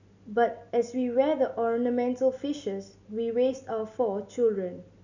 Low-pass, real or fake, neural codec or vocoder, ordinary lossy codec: 7.2 kHz; real; none; none